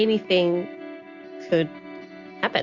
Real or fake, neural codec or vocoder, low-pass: real; none; 7.2 kHz